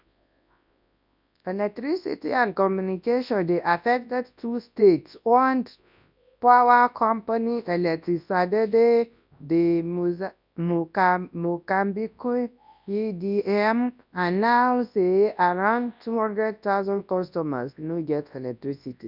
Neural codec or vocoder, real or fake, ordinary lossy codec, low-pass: codec, 24 kHz, 0.9 kbps, WavTokenizer, large speech release; fake; none; 5.4 kHz